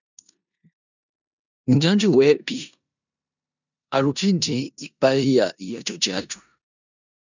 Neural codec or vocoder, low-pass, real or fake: codec, 16 kHz in and 24 kHz out, 0.9 kbps, LongCat-Audio-Codec, four codebook decoder; 7.2 kHz; fake